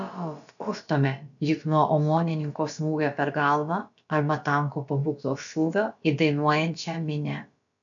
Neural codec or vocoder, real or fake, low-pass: codec, 16 kHz, about 1 kbps, DyCAST, with the encoder's durations; fake; 7.2 kHz